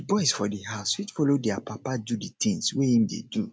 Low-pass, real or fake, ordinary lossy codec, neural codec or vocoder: none; real; none; none